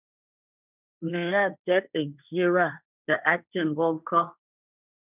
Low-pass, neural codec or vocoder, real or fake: 3.6 kHz; codec, 16 kHz, 1.1 kbps, Voila-Tokenizer; fake